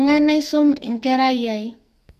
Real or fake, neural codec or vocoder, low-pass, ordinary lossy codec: fake; codec, 44.1 kHz, 2.6 kbps, SNAC; 14.4 kHz; MP3, 64 kbps